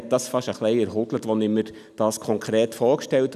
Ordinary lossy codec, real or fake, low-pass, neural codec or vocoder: none; real; 14.4 kHz; none